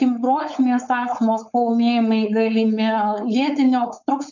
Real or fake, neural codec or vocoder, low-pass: fake; codec, 16 kHz, 4.8 kbps, FACodec; 7.2 kHz